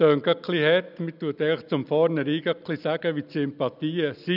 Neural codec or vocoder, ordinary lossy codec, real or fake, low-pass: none; none; real; 5.4 kHz